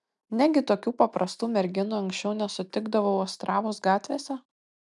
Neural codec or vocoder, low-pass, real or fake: none; 10.8 kHz; real